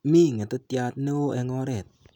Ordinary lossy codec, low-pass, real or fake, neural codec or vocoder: none; 19.8 kHz; real; none